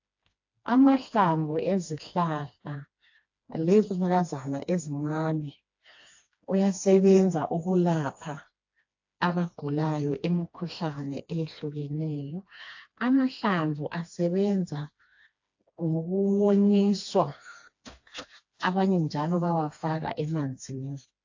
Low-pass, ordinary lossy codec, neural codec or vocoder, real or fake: 7.2 kHz; AAC, 48 kbps; codec, 16 kHz, 2 kbps, FreqCodec, smaller model; fake